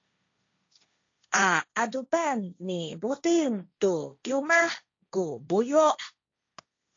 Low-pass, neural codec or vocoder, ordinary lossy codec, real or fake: 7.2 kHz; codec, 16 kHz, 1.1 kbps, Voila-Tokenizer; AAC, 48 kbps; fake